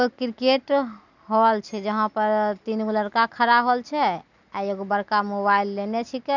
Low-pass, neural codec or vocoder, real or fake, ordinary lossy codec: 7.2 kHz; none; real; none